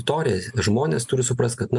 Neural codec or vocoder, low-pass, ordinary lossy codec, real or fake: none; 10.8 kHz; MP3, 96 kbps; real